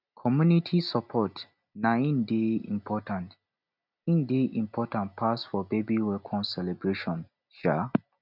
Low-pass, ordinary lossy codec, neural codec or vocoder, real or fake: 5.4 kHz; none; none; real